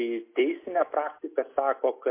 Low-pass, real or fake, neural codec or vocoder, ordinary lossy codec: 3.6 kHz; real; none; MP3, 24 kbps